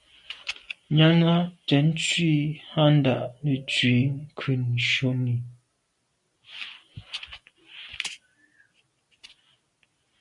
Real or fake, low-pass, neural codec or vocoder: real; 10.8 kHz; none